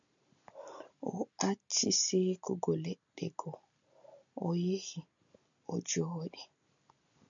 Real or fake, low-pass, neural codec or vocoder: real; 7.2 kHz; none